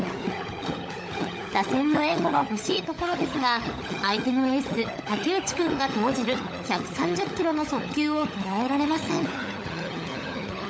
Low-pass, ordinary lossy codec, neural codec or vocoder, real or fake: none; none; codec, 16 kHz, 16 kbps, FunCodec, trained on LibriTTS, 50 frames a second; fake